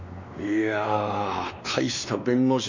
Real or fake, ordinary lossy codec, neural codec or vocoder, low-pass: fake; none; codec, 16 kHz, 2 kbps, X-Codec, WavLM features, trained on Multilingual LibriSpeech; 7.2 kHz